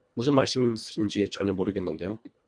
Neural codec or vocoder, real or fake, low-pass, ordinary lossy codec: codec, 24 kHz, 1.5 kbps, HILCodec; fake; 9.9 kHz; MP3, 96 kbps